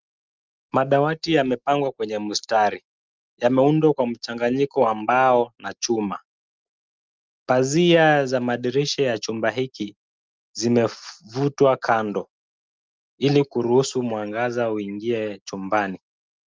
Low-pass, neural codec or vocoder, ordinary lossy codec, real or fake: 7.2 kHz; none; Opus, 32 kbps; real